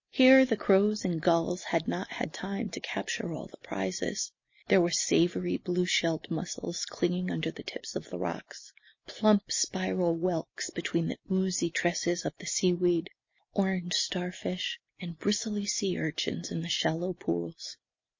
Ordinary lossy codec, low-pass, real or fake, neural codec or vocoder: MP3, 32 kbps; 7.2 kHz; real; none